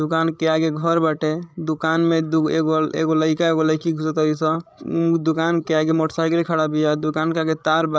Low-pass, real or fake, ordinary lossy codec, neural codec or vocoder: none; fake; none; codec, 16 kHz, 16 kbps, FreqCodec, larger model